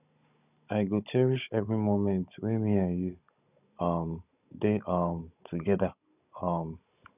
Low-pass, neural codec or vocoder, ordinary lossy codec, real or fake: 3.6 kHz; codec, 16 kHz, 16 kbps, FunCodec, trained on Chinese and English, 50 frames a second; none; fake